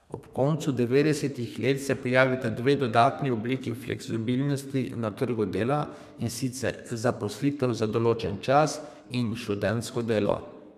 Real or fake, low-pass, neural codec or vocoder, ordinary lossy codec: fake; 14.4 kHz; codec, 32 kHz, 1.9 kbps, SNAC; none